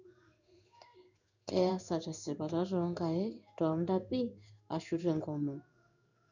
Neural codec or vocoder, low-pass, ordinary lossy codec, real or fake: codec, 16 kHz in and 24 kHz out, 1 kbps, XY-Tokenizer; 7.2 kHz; none; fake